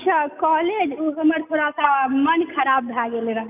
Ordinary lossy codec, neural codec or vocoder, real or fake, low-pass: none; none; real; 3.6 kHz